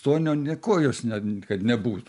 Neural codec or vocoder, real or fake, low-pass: none; real; 10.8 kHz